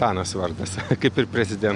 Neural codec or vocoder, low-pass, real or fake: none; 10.8 kHz; real